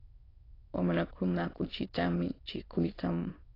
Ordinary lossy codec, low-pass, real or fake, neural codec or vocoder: AAC, 24 kbps; 5.4 kHz; fake; autoencoder, 22.05 kHz, a latent of 192 numbers a frame, VITS, trained on many speakers